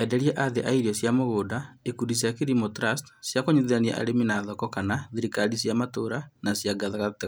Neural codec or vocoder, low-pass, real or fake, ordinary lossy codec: none; none; real; none